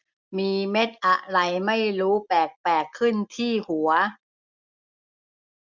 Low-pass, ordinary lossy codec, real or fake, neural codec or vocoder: 7.2 kHz; MP3, 64 kbps; real; none